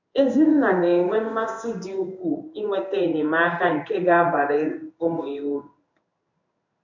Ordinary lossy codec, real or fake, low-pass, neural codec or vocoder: none; fake; 7.2 kHz; codec, 16 kHz in and 24 kHz out, 1 kbps, XY-Tokenizer